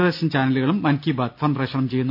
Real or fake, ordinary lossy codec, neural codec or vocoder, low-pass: real; none; none; 5.4 kHz